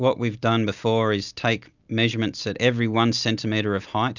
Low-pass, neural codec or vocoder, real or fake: 7.2 kHz; none; real